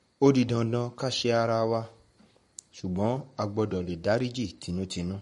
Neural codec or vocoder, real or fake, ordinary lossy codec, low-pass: codec, 44.1 kHz, 7.8 kbps, Pupu-Codec; fake; MP3, 48 kbps; 19.8 kHz